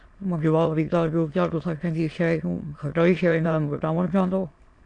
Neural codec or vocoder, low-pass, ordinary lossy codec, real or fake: autoencoder, 22.05 kHz, a latent of 192 numbers a frame, VITS, trained on many speakers; 9.9 kHz; AAC, 48 kbps; fake